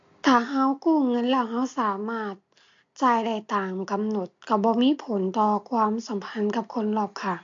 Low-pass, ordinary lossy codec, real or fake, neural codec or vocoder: 7.2 kHz; MP3, 48 kbps; real; none